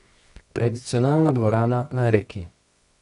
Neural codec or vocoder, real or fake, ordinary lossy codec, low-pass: codec, 24 kHz, 0.9 kbps, WavTokenizer, medium music audio release; fake; none; 10.8 kHz